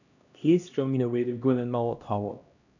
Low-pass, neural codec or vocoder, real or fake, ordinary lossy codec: 7.2 kHz; codec, 16 kHz, 1 kbps, X-Codec, HuBERT features, trained on LibriSpeech; fake; none